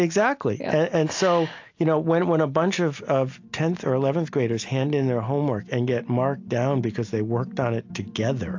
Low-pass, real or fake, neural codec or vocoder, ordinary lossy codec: 7.2 kHz; real; none; AAC, 48 kbps